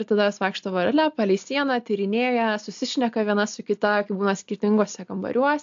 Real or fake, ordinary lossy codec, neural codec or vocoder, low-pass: real; AAC, 64 kbps; none; 7.2 kHz